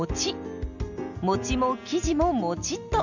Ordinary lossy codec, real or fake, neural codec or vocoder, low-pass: none; real; none; 7.2 kHz